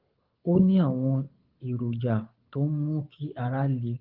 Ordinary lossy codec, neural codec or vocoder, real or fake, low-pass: Opus, 32 kbps; codec, 24 kHz, 6 kbps, HILCodec; fake; 5.4 kHz